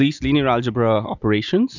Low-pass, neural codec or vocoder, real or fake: 7.2 kHz; none; real